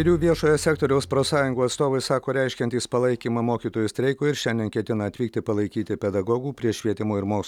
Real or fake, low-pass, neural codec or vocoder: real; 19.8 kHz; none